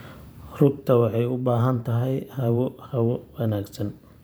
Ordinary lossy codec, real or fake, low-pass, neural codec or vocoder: none; fake; none; vocoder, 44.1 kHz, 128 mel bands every 512 samples, BigVGAN v2